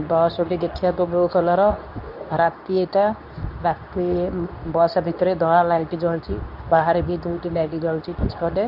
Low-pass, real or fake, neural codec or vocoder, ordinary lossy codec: 5.4 kHz; fake; codec, 24 kHz, 0.9 kbps, WavTokenizer, medium speech release version 2; none